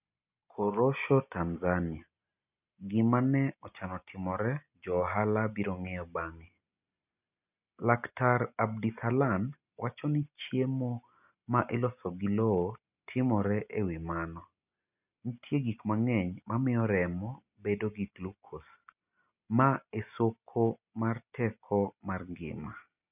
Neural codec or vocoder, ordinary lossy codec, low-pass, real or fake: none; none; 3.6 kHz; real